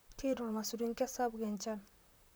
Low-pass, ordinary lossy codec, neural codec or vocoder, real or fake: none; none; vocoder, 44.1 kHz, 128 mel bands, Pupu-Vocoder; fake